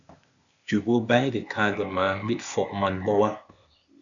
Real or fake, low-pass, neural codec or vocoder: fake; 7.2 kHz; codec, 16 kHz, 0.8 kbps, ZipCodec